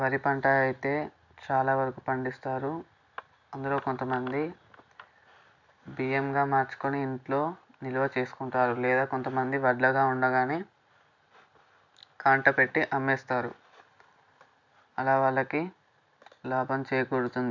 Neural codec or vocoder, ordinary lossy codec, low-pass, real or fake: none; none; 7.2 kHz; real